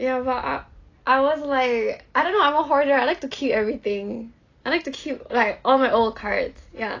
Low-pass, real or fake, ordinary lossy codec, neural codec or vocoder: 7.2 kHz; real; AAC, 32 kbps; none